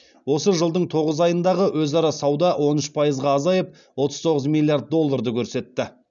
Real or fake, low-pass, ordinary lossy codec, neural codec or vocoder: real; 7.2 kHz; none; none